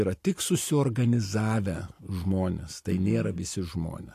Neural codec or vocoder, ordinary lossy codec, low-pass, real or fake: none; AAC, 64 kbps; 14.4 kHz; real